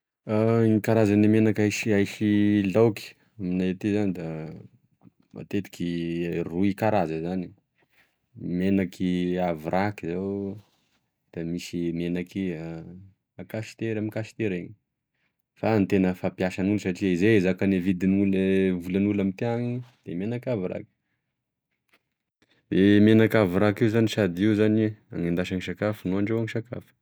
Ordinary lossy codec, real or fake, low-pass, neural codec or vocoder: none; real; none; none